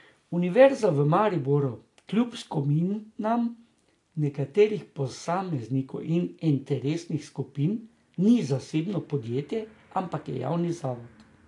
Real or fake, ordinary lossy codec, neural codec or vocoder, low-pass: real; AAC, 48 kbps; none; 10.8 kHz